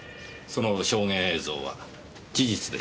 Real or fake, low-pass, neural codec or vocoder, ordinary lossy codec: real; none; none; none